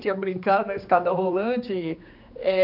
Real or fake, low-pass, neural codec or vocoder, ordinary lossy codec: fake; 5.4 kHz; codec, 16 kHz, 2 kbps, X-Codec, HuBERT features, trained on general audio; none